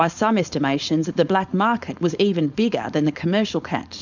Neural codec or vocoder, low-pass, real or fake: codec, 16 kHz, 4.8 kbps, FACodec; 7.2 kHz; fake